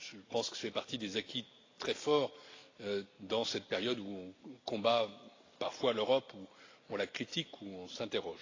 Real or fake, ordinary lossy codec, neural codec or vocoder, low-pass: real; AAC, 32 kbps; none; 7.2 kHz